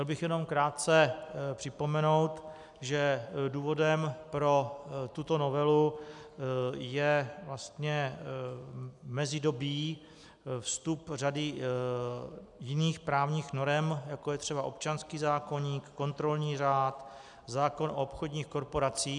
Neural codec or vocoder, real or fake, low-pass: none; real; 10.8 kHz